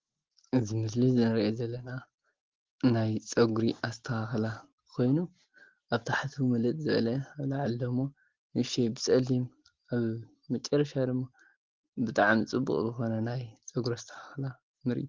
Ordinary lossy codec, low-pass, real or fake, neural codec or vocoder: Opus, 16 kbps; 7.2 kHz; real; none